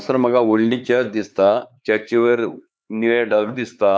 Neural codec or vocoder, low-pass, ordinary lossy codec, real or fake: codec, 16 kHz, 4 kbps, X-Codec, HuBERT features, trained on LibriSpeech; none; none; fake